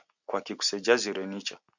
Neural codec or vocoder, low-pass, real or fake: none; 7.2 kHz; real